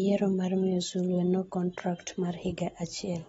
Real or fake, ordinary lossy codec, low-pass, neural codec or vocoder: real; AAC, 24 kbps; 19.8 kHz; none